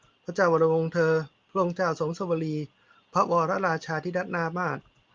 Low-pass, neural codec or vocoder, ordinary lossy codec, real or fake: 7.2 kHz; none; Opus, 32 kbps; real